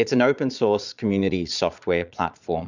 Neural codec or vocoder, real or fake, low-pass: vocoder, 22.05 kHz, 80 mel bands, Vocos; fake; 7.2 kHz